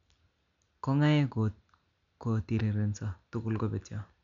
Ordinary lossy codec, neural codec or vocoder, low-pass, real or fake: MP3, 64 kbps; none; 7.2 kHz; real